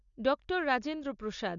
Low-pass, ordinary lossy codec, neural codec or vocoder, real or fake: 7.2 kHz; none; none; real